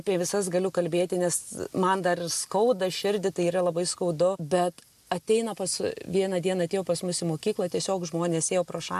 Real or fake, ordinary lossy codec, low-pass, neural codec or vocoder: real; AAC, 96 kbps; 14.4 kHz; none